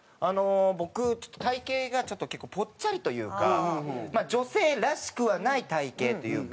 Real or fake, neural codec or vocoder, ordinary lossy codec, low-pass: real; none; none; none